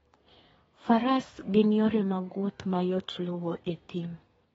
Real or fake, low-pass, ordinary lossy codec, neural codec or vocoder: fake; 14.4 kHz; AAC, 24 kbps; codec, 32 kHz, 1.9 kbps, SNAC